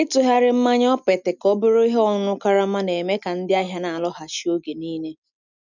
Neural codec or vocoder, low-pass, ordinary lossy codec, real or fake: none; 7.2 kHz; none; real